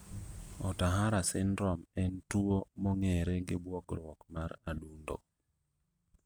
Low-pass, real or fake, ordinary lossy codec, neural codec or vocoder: none; real; none; none